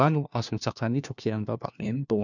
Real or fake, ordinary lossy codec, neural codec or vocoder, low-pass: fake; none; codec, 16 kHz, 1 kbps, FunCodec, trained on LibriTTS, 50 frames a second; 7.2 kHz